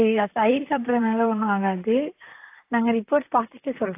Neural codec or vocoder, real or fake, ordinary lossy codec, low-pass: vocoder, 44.1 kHz, 128 mel bands, Pupu-Vocoder; fake; AAC, 24 kbps; 3.6 kHz